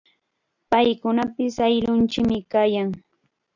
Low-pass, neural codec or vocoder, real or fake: 7.2 kHz; none; real